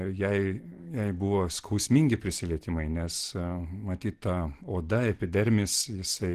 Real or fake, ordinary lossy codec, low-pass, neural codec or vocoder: real; Opus, 16 kbps; 14.4 kHz; none